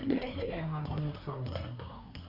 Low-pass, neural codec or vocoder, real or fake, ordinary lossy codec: 5.4 kHz; codec, 24 kHz, 1 kbps, SNAC; fake; none